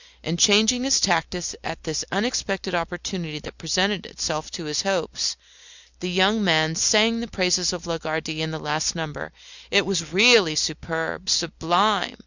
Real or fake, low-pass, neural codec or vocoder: real; 7.2 kHz; none